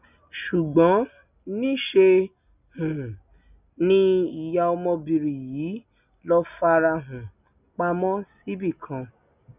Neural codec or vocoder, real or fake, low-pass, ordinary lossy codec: none; real; 3.6 kHz; none